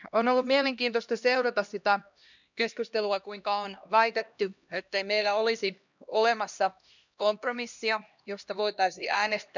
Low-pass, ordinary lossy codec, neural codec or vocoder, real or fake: 7.2 kHz; none; codec, 16 kHz, 1 kbps, X-Codec, HuBERT features, trained on LibriSpeech; fake